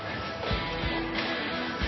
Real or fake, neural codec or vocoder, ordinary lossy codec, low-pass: real; none; MP3, 24 kbps; 7.2 kHz